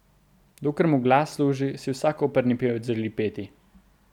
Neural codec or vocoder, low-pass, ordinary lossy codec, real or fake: none; 19.8 kHz; none; real